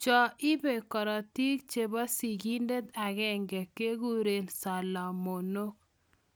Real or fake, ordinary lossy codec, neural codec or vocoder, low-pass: real; none; none; none